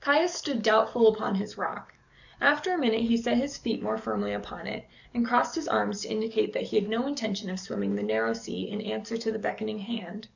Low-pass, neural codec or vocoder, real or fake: 7.2 kHz; codec, 44.1 kHz, 7.8 kbps, DAC; fake